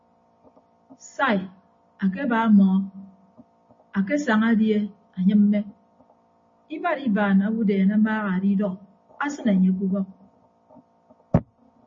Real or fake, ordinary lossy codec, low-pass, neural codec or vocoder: real; MP3, 32 kbps; 7.2 kHz; none